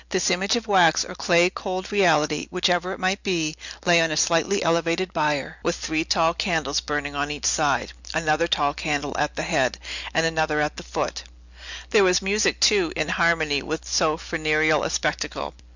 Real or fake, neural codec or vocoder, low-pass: real; none; 7.2 kHz